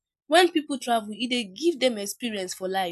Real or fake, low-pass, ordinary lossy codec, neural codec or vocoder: real; 14.4 kHz; none; none